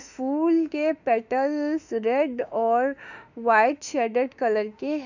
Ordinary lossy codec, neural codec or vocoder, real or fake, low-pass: none; autoencoder, 48 kHz, 32 numbers a frame, DAC-VAE, trained on Japanese speech; fake; 7.2 kHz